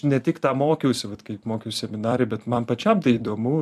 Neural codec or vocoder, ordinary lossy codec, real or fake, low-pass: vocoder, 44.1 kHz, 128 mel bands every 256 samples, BigVGAN v2; AAC, 96 kbps; fake; 14.4 kHz